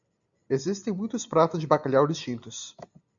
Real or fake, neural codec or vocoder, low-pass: real; none; 7.2 kHz